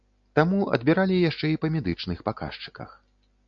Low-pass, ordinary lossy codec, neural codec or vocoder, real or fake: 7.2 kHz; MP3, 64 kbps; none; real